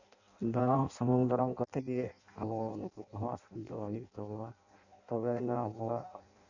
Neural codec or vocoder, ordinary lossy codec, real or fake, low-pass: codec, 16 kHz in and 24 kHz out, 0.6 kbps, FireRedTTS-2 codec; none; fake; 7.2 kHz